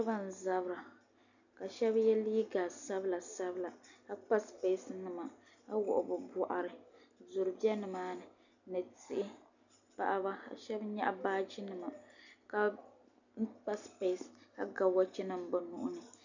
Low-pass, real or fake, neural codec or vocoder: 7.2 kHz; real; none